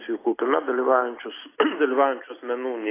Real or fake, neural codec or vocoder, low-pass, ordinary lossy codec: real; none; 3.6 kHz; AAC, 16 kbps